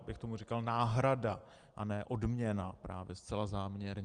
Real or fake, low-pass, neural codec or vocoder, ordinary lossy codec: real; 9.9 kHz; none; Opus, 24 kbps